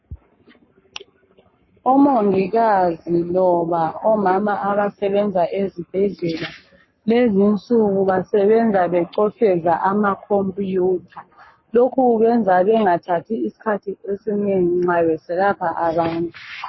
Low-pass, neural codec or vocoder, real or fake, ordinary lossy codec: 7.2 kHz; codec, 44.1 kHz, 7.8 kbps, Pupu-Codec; fake; MP3, 24 kbps